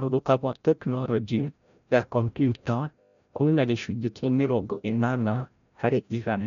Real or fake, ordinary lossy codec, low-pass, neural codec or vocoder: fake; MP3, 96 kbps; 7.2 kHz; codec, 16 kHz, 0.5 kbps, FreqCodec, larger model